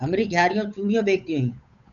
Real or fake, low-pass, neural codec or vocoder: fake; 7.2 kHz; codec, 16 kHz, 8 kbps, FunCodec, trained on Chinese and English, 25 frames a second